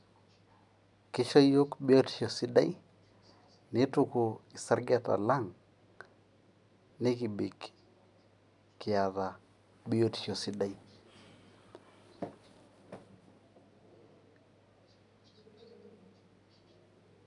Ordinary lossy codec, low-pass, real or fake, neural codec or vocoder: none; 10.8 kHz; real; none